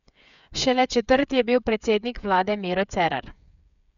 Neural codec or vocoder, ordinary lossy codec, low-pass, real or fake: codec, 16 kHz, 8 kbps, FreqCodec, smaller model; MP3, 96 kbps; 7.2 kHz; fake